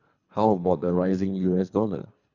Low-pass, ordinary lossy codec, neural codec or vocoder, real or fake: 7.2 kHz; none; codec, 24 kHz, 3 kbps, HILCodec; fake